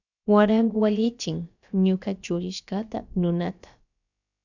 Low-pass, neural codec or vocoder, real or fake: 7.2 kHz; codec, 16 kHz, about 1 kbps, DyCAST, with the encoder's durations; fake